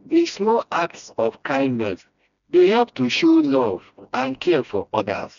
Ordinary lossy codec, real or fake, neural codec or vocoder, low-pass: none; fake; codec, 16 kHz, 1 kbps, FreqCodec, smaller model; 7.2 kHz